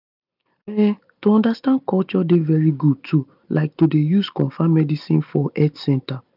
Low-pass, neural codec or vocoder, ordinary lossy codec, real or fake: 5.4 kHz; none; none; real